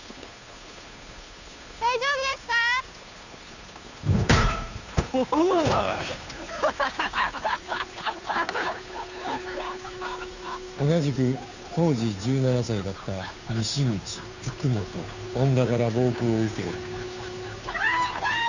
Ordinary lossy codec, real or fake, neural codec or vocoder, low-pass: none; fake; codec, 16 kHz, 2 kbps, FunCodec, trained on Chinese and English, 25 frames a second; 7.2 kHz